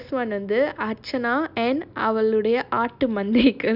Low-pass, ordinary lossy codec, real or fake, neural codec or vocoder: 5.4 kHz; none; real; none